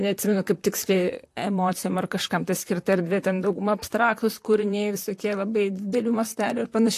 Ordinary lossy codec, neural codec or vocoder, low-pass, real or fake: AAC, 64 kbps; vocoder, 44.1 kHz, 128 mel bands, Pupu-Vocoder; 14.4 kHz; fake